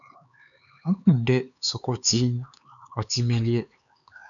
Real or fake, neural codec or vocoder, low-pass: fake; codec, 16 kHz, 4 kbps, X-Codec, HuBERT features, trained on LibriSpeech; 7.2 kHz